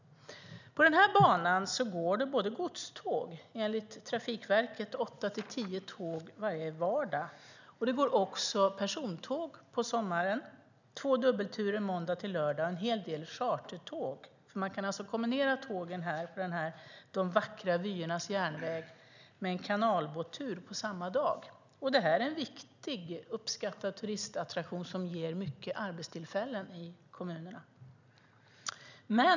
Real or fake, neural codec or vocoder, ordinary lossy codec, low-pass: real; none; none; 7.2 kHz